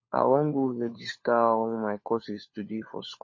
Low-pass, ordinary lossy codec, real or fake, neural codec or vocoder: 7.2 kHz; MP3, 32 kbps; fake; codec, 16 kHz, 4 kbps, FunCodec, trained on LibriTTS, 50 frames a second